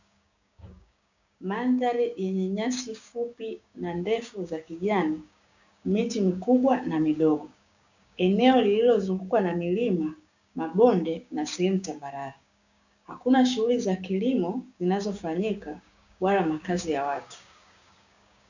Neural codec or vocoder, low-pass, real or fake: codec, 16 kHz, 6 kbps, DAC; 7.2 kHz; fake